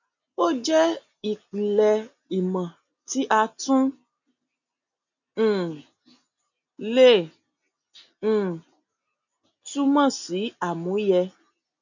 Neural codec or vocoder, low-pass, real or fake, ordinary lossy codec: none; 7.2 kHz; real; none